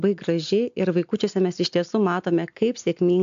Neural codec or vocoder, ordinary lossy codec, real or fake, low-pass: none; AAC, 64 kbps; real; 7.2 kHz